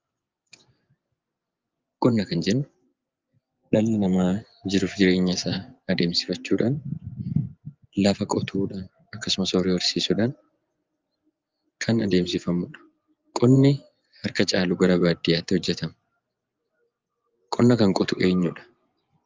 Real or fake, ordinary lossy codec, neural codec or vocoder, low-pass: fake; Opus, 32 kbps; vocoder, 24 kHz, 100 mel bands, Vocos; 7.2 kHz